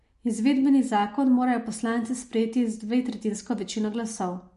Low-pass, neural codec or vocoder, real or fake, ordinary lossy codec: 14.4 kHz; none; real; MP3, 48 kbps